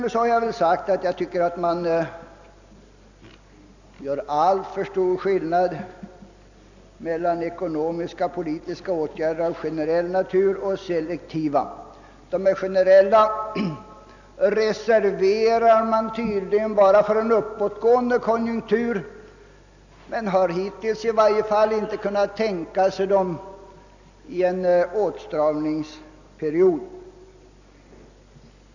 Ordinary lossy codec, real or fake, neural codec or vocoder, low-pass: none; real; none; 7.2 kHz